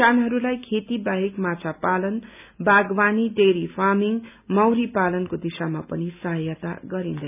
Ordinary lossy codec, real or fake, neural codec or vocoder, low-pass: none; real; none; 3.6 kHz